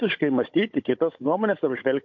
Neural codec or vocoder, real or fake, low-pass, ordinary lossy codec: codec, 16 kHz, 16 kbps, FunCodec, trained on Chinese and English, 50 frames a second; fake; 7.2 kHz; MP3, 48 kbps